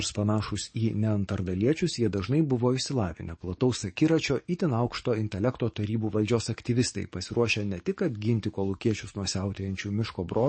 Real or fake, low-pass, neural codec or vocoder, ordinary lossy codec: fake; 9.9 kHz; codec, 44.1 kHz, 7.8 kbps, DAC; MP3, 32 kbps